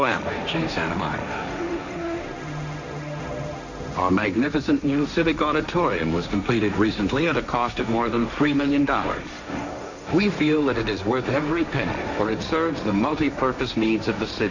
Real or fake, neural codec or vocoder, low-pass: fake; codec, 16 kHz, 1.1 kbps, Voila-Tokenizer; 7.2 kHz